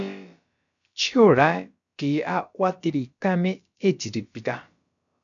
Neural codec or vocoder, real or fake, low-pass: codec, 16 kHz, about 1 kbps, DyCAST, with the encoder's durations; fake; 7.2 kHz